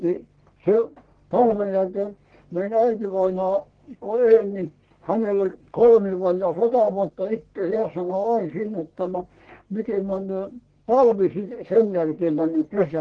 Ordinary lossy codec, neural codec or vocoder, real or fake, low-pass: Opus, 16 kbps; codec, 44.1 kHz, 1.7 kbps, Pupu-Codec; fake; 9.9 kHz